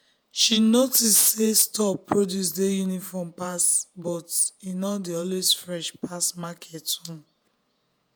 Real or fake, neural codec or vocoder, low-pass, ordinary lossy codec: fake; vocoder, 48 kHz, 128 mel bands, Vocos; none; none